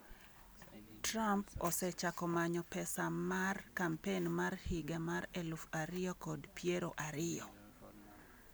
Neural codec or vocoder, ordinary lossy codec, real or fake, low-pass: none; none; real; none